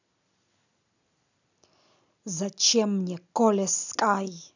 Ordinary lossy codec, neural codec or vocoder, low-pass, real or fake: none; none; 7.2 kHz; real